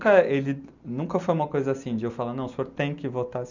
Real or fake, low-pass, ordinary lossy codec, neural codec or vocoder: real; 7.2 kHz; none; none